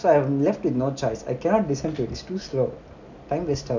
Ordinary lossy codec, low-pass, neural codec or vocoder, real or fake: none; 7.2 kHz; none; real